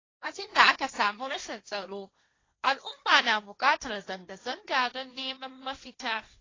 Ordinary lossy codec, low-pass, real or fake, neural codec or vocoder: AAC, 32 kbps; 7.2 kHz; fake; codec, 16 kHz, 1.1 kbps, Voila-Tokenizer